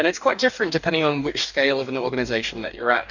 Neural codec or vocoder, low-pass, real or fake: codec, 44.1 kHz, 2.6 kbps, DAC; 7.2 kHz; fake